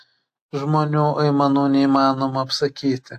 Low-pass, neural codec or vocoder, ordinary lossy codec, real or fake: 14.4 kHz; none; AAC, 64 kbps; real